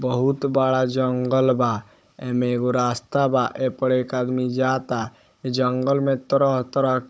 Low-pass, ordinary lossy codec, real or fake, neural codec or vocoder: none; none; fake; codec, 16 kHz, 16 kbps, FunCodec, trained on Chinese and English, 50 frames a second